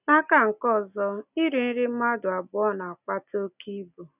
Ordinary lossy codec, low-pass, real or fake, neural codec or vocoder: none; 3.6 kHz; real; none